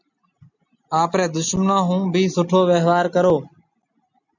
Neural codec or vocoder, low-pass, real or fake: none; 7.2 kHz; real